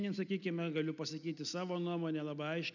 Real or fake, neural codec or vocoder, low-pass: real; none; 7.2 kHz